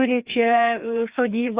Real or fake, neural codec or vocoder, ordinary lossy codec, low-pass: fake; codec, 16 kHz, 2 kbps, FreqCodec, larger model; Opus, 64 kbps; 3.6 kHz